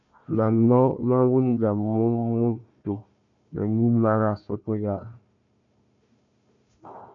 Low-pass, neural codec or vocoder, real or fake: 7.2 kHz; codec, 16 kHz, 1 kbps, FunCodec, trained on Chinese and English, 50 frames a second; fake